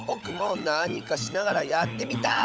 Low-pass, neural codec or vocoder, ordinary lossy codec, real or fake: none; codec, 16 kHz, 16 kbps, FunCodec, trained on LibriTTS, 50 frames a second; none; fake